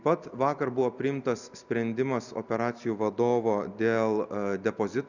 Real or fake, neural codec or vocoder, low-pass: real; none; 7.2 kHz